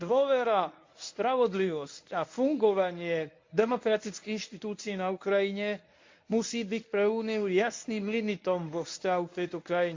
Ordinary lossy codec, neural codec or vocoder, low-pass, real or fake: MP3, 48 kbps; codec, 24 kHz, 0.9 kbps, WavTokenizer, medium speech release version 1; 7.2 kHz; fake